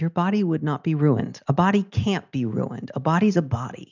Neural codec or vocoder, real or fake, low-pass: none; real; 7.2 kHz